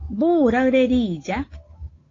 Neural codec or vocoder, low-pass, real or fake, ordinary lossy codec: codec, 16 kHz, 8 kbps, FunCodec, trained on Chinese and English, 25 frames a second; 7.2 kHz; fake; AAC, 32 kbps